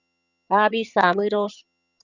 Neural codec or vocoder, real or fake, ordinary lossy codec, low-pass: vocoder, 22.05 kHz, 80 mel bands, HiFi-GAN; fake; Opus, 64 kbps; 7.2 kHz